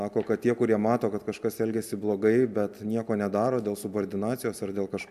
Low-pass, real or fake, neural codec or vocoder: 14.4 kHz; real; none